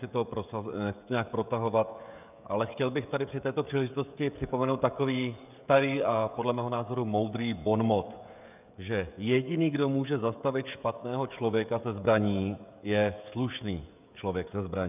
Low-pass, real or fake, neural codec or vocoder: 3.6 kHz; fake; codec, 16 kHz, 16 kbps, FreqCodec, smaller model